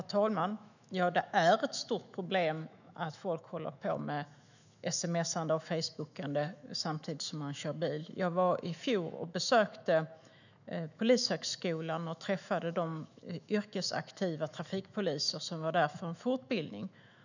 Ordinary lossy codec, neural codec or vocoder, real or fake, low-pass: none; autoencoder, 48 kHz, 128 numbers a frame, DAC-VAE, trained on Japanese speech; fake; 7.2 kHz